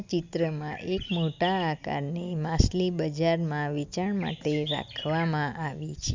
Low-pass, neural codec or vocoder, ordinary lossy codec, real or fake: 7.2 kHz; none; MP3, 64 kbps; real